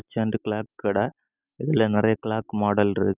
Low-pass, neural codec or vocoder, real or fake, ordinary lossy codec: 3.6 kHz; none; real; none